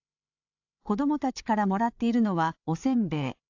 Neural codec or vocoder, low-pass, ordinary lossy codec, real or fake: codec, 16 kHz, 16 kbps, FreqCodec, larger model; 7.2 kHz; AAC, 48 kbps; fake